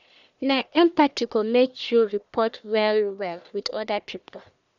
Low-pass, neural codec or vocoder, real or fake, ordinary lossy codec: 7.2 kHz; codec, 44.1 kHz, 1.7 kbps, Pupu-Codec; fake; none